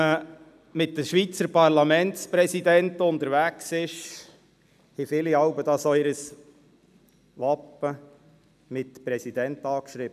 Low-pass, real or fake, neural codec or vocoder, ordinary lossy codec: 14.4 kHz; real; none; none